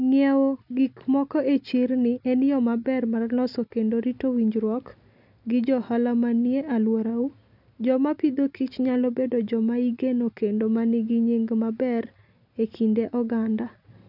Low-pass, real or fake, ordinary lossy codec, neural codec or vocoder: 5.4 kHz; real; none; none